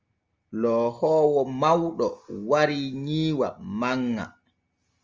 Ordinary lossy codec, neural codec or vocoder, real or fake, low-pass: Opus, 32 kbps; none; real; 7.2 kHz